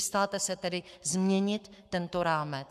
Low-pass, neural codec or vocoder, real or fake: 14.4 kHz; codec, 44.1 kHz, 7.8 kbps, Pupu-Codec; fake